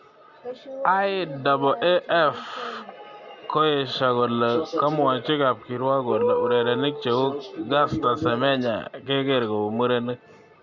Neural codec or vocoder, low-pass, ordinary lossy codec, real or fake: none; 7.2 kHz; Opus, 64 kbps; real